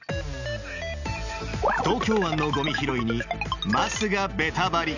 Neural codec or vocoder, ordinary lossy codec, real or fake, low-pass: none; none; real; 7.2 kHz